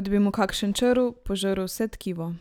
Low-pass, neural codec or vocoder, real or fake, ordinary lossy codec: 19.8 kHz; none; real; none